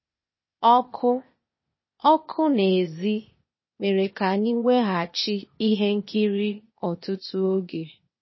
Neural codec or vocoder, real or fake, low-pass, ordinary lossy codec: codec, 16 kHz, 0.8 kbps, ZipCodec; fake; 7.2 kHz; MP3, 24 kbps